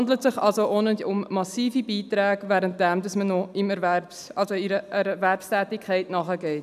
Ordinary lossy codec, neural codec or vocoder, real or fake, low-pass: none; none; real; 14.4 kHz